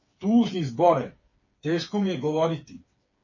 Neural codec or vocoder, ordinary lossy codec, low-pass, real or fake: codec, 16 kHz, 4 kbps, FreqCodec, smaller model; MP3, 32 kbps; 7.2 kHz; fake